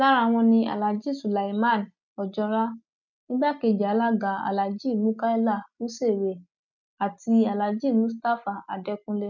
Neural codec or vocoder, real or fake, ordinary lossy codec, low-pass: none; real; none; 7.2 kHz